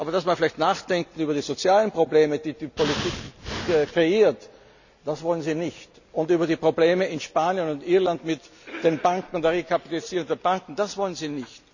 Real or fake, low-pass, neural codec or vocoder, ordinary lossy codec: real; 7.2 kHz; none; none